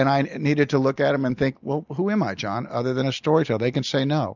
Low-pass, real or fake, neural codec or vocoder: 7.2 kHz; real; none